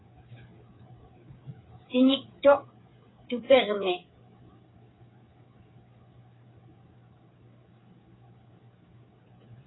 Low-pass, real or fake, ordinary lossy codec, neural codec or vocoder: 7.2 kHz; fake; AAC, 16 kbps; codec, 16 kHz, 8 kbps, FreqCodec, smaller model